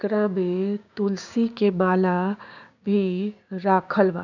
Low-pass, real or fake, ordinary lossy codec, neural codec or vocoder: 7.2 kHz; fake; none; codec, 16 kHz, 6 kbps, DAC